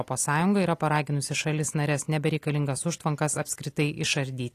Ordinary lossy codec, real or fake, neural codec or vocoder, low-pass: AAC, 48 kbps; real; none; 14.4 kHz